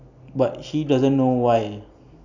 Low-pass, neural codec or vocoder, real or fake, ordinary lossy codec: 7.2 kHz; none; real; none